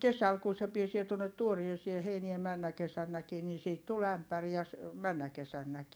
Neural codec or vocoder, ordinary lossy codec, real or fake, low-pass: codec, 44.1 kHz, 7.8 kbps, DAC; none; fake; none